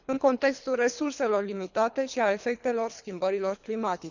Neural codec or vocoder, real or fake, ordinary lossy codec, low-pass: codec, 24 kHz, 3 kbps, HILCodec; fake; none; 7.2 kHz